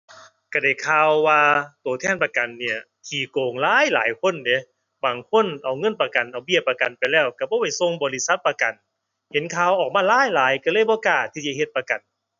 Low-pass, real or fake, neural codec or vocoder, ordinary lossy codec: 7.2 kHz; real; none; none